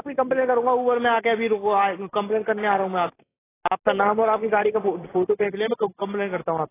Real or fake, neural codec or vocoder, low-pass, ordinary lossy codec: real; none; 3.6 kHz; AAC, 16 kbps